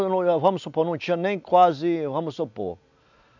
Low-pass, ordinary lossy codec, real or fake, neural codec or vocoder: 7.2 kHz; none; real; none